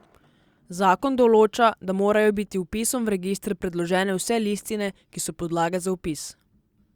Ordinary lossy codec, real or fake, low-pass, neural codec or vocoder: Opus, 64 kbps; real; 19.8 kHz; none